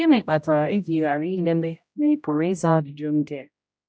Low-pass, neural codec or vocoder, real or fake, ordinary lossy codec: none; codec, 16 kHz, 0.5 kbps, X-Codec, HuBERT features, trained on general audio; fake; none